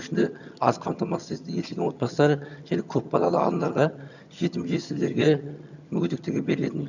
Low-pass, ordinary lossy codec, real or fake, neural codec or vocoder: 7.2 kHz; none; fake; vocoder, 22.05 kHz, 80 mel bands, HiFi-GAN